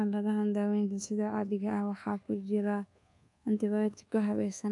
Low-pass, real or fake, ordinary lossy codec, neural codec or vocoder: 10.8 kHz; fake; none; codec, 24 kHz, 1.2 kbps, DualCodec